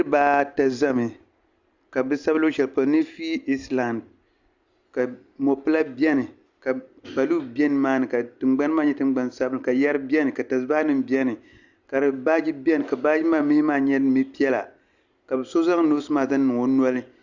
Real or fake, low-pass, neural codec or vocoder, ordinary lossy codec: real; 7.2 kHz; none; Opus, 64 kbps